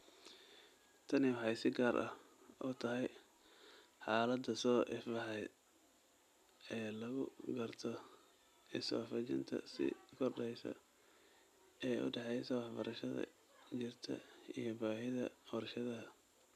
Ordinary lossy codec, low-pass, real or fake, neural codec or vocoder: none; 14.4 kHz; real; none